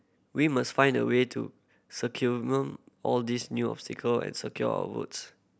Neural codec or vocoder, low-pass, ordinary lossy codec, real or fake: none; none; none; real